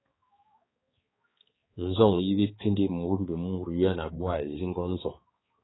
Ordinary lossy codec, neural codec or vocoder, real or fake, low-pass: AAC, 16 kbps; codec, 16 kHz, 4 kbps, X-Codec, HuBERT features, trained on balanced general audio; fake; 7.2 kHz